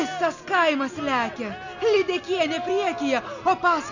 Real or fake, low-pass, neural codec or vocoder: real; 7.2 kHz; none